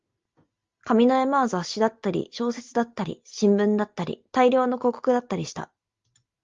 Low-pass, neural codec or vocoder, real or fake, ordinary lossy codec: 7.2 kHz; none; real; Opus, 32 kbps